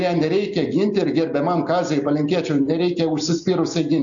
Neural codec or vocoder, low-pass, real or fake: none; 7.2 kHz; real